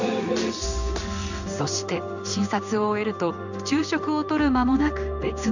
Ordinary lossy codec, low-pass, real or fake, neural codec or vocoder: none; 7.2 kHz; fake; codec, 16 kHz in and 24 kHz out, 1 kbps, XY-Tokenizer